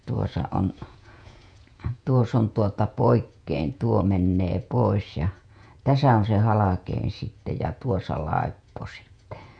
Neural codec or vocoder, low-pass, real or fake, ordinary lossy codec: vocoder, 48 kHz, 128 mel bands, Vocos; 9.9 kHz; fake; none